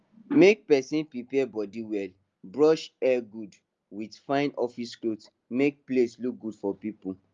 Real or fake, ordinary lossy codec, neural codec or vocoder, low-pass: real; Opus, 32 kbps; none; 7.2 kHz